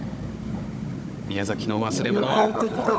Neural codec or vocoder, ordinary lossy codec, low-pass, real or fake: codec, 16 kHz, 16 kbps, FunCodec, trained on Chinese and English, 50 frames a second; none; none; fake